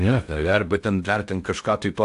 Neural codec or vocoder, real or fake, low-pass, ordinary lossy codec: codec, 16 kHz in and 24 kHz out, 0.6 kbps, FocalCodec, streaming, 2048 codes; fake; 10.8 kHz; AAC, 64 kbps